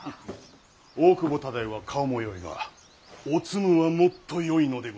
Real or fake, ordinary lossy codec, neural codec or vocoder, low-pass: real; none; none; none